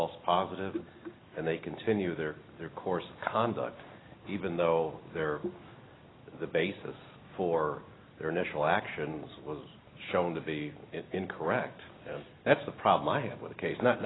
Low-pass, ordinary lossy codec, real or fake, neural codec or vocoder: 7.2 kHz; AAC, 16 kbps; real; none